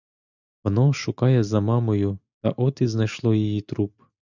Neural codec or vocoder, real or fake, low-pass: none; real; 7.2 kHz